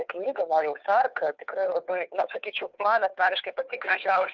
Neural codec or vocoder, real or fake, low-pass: codec, 16 kHz, 2 kbps, FunCodec, trained on Chinese and English, 25 frames a second; fake; 7.2 kHz